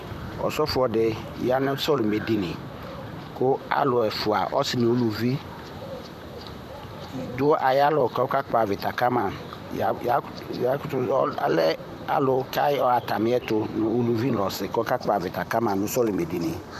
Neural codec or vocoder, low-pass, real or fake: vocoder, 44.1 kHz, 128 mel bands, Pupu-Vocoder; 14.4 kHz; fake